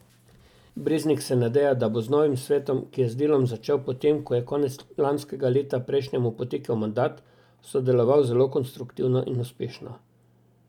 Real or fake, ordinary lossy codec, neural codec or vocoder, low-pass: real; none; none; 19.8 kHz